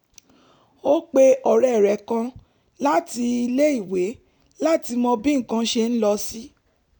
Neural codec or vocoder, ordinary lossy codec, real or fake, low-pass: none; none; real; none